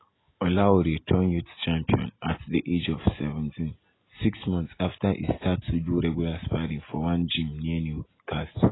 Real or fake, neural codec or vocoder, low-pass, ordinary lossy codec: real; none; 7.2 kHz; AAC, 16 kbps